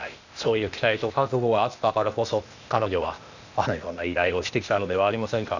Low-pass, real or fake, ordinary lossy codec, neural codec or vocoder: 7.2 kHz; fake; none; codec, 16 kHz, 0.8 kbps, ZipCodec